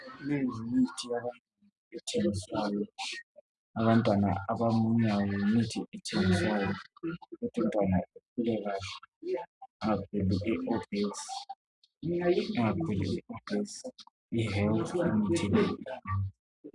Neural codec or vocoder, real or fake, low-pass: none; real; 10.8 kHz